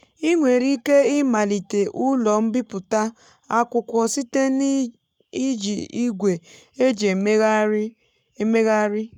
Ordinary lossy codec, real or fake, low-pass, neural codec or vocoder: none; fake; 19.8 kHz; codec, 44.1 kHz, 7.8 kbps, Pupu-Codec